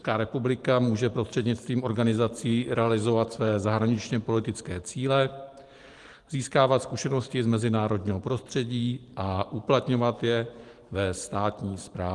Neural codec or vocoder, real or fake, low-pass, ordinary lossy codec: vocoder, 44.1 kHz, 128 mel bands every 512 samples, BigVGAN v2; fake; 10.8 kHz; Opus, 24 kbps